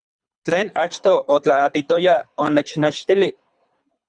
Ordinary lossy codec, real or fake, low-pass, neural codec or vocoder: Opus, 64 kbps; fake; 9.9 kHz; codec, 24 kHz, 3 kbps, HILCodec